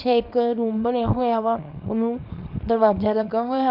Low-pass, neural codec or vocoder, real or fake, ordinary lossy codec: 5.4 kHz; codec, 24 kHz, 0.9 kbps, WavTokenizer, small release; fake; none